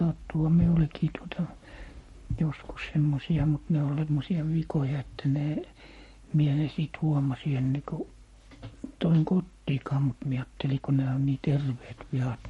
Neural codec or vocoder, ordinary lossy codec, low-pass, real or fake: codec, 44.1 kHz, 7.8 kbps, Pupu-Codec; MP3, 48 kbps; 19.8 kHz; fake